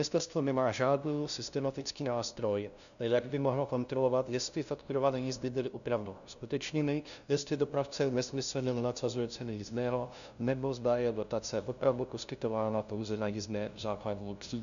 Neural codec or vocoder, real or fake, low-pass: codec, 16 kHz, 0.5 kbps, FunCodec, trained on LibriTTS, 25 frames a second; fake; 7.2 kHz